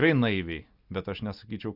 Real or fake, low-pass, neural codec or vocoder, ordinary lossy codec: real; 5.4 kHz; none; Opus, 64 kbps